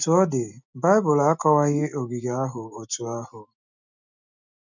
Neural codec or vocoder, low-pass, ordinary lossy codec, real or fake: none; 7.2 kHz; none; real